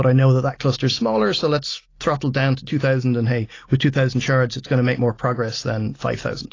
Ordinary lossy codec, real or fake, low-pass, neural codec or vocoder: AAC, 32 kbps; real; 7.2 kHz; none